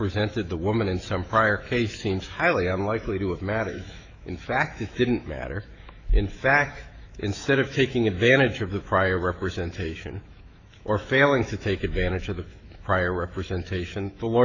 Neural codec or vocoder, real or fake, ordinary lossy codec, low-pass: autoencoder, 48 kHz, 128 numbers a frame, DAC-VAE, trained on Japanese speech; fake; AAC, 48 kbps; 7.2 kHz